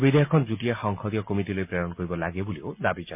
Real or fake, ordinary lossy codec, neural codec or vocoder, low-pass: real; MP3, 32 kbps; none; 3.6 kHz